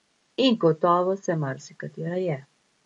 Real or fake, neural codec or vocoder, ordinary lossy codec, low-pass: real; none; MP3, 48 kbps; 19.8 kHz